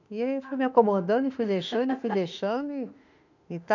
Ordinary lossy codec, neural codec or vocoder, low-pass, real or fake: none; autoencoder, 48 kHz, 32 numbers a frame, DAC-VAE, trained on Japanese speech; 7.2 kHz; fake